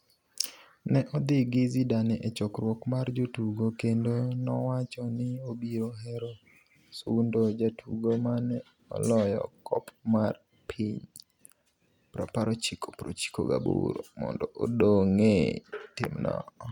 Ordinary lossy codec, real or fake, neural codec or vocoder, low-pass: none; real; none; 19.8 kHz